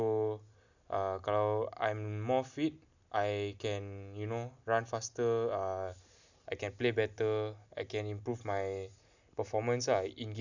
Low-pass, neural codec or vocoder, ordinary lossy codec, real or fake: 7.2 kHz; none; none; real